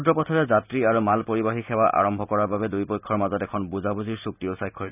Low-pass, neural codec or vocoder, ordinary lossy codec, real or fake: 3.6 kHz; none; none; real